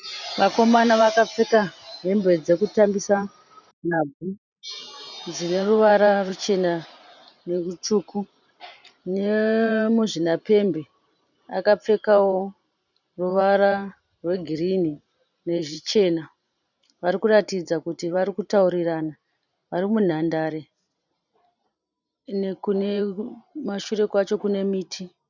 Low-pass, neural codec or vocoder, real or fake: 7.2 kHz; vocoder, 44.1 kHz, 128 mel bands every 512 samples, BigVGAN v2; fake